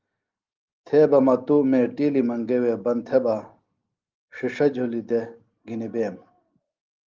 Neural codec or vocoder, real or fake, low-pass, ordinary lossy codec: none; real; 7.2 kHz; Opus, 32 kbps